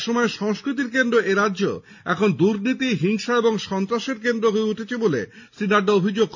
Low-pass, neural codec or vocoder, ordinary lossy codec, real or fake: 7.2 kHz; none; none; real